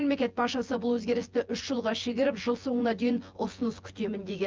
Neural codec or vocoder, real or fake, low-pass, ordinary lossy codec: vocoder, 24 kHz, 100 mel bands, Vocos; fake; 7.2 kHz; Opus, 32 kbps